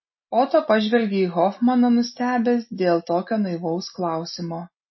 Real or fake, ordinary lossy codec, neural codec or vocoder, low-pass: real; MP3, 24 kbps; none; 7.2 kHz